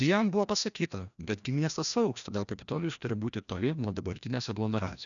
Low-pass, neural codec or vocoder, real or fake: 7.2 kHz; codec, 16 kHz, 1 kbps, FreqCodec, larger model; fake